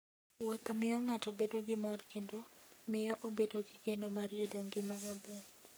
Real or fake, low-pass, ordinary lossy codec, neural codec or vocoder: fake; none; none; codec, 44.1 kHz, 3.4 kbps, Pupu-Codec